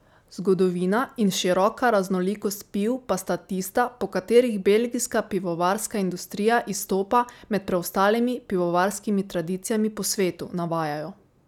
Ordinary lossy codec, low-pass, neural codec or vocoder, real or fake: none; 19.8 kHz; none; real